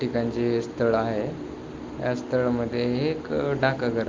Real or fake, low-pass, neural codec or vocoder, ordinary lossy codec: real; 7.2 kHz; none; Opus, 32 kbps